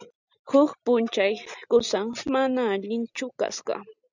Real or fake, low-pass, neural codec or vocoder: real; 7.2 kHz; none